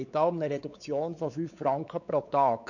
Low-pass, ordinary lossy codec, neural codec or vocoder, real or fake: 7.2 kHz; none; codec, 16 kHz, 4 kbps, X-Codec, WavLM features, trained on Multilingual LibriSpeech; fake